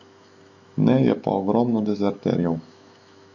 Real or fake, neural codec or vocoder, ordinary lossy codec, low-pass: fake; autoencoder, 48 kHz, 128 numbers a frame, DAC-VAE, trained on Japanese speech; MP3, 48 kbps; 7.2 kHz